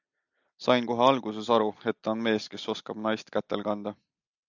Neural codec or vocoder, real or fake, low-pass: none; real; 7.2 kHz